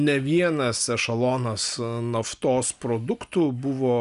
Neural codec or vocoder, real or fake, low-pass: none; real; 10.8 kHz